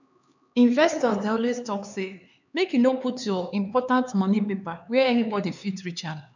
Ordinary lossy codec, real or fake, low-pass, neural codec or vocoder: none; fake; 7.2 kHz; codec, 16 kHz, 4 kbps, X-Codec, HuBERT features, trained on LibriSpeech